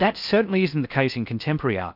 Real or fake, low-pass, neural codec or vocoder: fake; 5.4 kHz; codec, 16 kHz in and 24 kHz out, 0.6 kbps, FocalCodec, streaming, 4096 codes